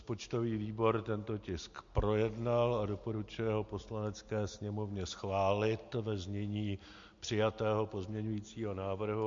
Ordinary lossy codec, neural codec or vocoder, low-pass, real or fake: MP3, 48 kbps; none; 7.2 kHz; real